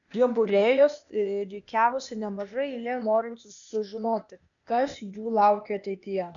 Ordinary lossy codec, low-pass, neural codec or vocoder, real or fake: MP3, 96 kbps; 7.2 kHz; codec, 16 kHz, 0.8 kbps, ZipCodec; fake